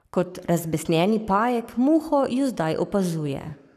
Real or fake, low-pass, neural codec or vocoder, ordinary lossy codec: fake; 14.4 kHz; codec, 44.1 kHz, 7.8 kbps, Pupu-Codec; none